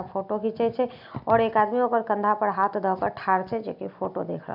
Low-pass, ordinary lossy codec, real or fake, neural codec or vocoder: 5.4 kHz; none; real; none